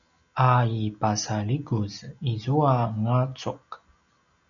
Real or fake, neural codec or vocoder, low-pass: real; none; 7.2 kHz